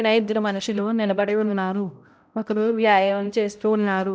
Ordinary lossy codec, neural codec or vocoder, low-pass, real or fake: none; codec, 16 kHz, 0.5 kbps, X-Codec, HuBERT features, trained on balanced general audio; none; fake